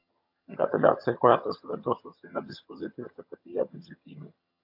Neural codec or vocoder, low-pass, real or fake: vocoder, 22.05 kHz, 80 mel bands, HiFi-GAN; 5.4 kHz; fake